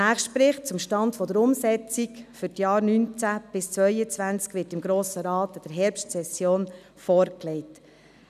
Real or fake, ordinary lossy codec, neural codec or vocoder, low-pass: real; none; none; 14.4 kHz